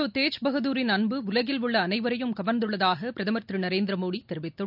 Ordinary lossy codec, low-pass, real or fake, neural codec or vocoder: none; 5.4 kHz; real; none